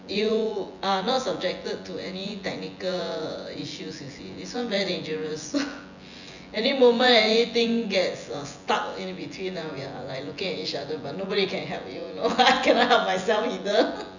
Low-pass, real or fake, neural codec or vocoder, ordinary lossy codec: 7.2 kHz; fake; vocoder, 24 kHz, 100 mel bands, Vocos; none